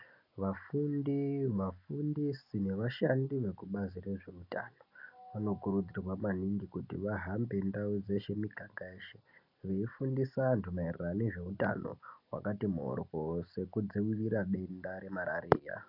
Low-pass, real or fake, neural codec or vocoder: 5.4 kHz; real; none